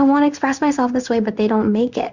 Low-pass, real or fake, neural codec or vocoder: 7.2 kHz; fake; codec, 16 kHz in and 24 kHz out, 1 kbps, XY-Tokenizer